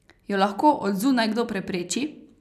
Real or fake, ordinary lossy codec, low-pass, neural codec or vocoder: real; none; 14.4 kHz; none